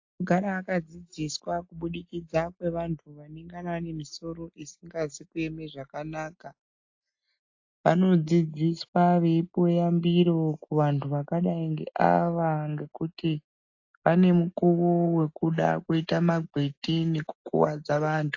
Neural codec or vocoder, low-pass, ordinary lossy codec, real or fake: none; 7.2 kHz; AAC, 48 kbps; real